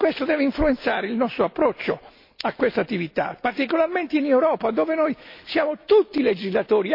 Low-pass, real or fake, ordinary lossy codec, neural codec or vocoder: 5.4 kHz; real; MP3, 32 kbps; none